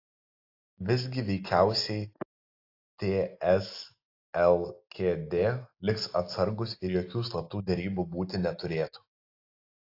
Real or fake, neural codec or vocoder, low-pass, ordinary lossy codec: real; none; 5.4 kHz; AAC, 32 kbps